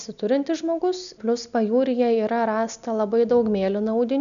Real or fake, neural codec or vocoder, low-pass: real; none; 7.2 kHz